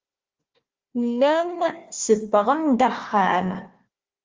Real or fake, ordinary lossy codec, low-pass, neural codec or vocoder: fake; Opus, 32 kbps; 7.2 kHz; codec, 16 kHz, 1 kbps, FunCodec, trained on Chinese and English, 50 frames a second